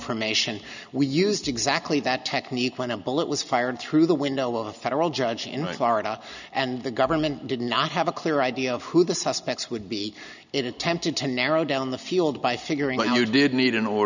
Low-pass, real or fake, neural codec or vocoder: 7.2 kHz; real; none